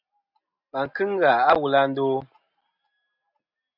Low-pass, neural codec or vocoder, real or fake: 5.4 kHz; none; real